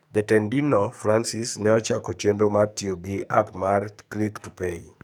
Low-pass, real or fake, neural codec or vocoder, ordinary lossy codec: none; fake; codec, 44.1 kHz, 2.6 kbps, SNAC; none